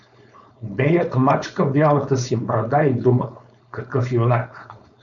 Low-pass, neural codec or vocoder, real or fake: 7.2 kHz; codec, 16 kHz, 4.8 kbps, FACodec; fake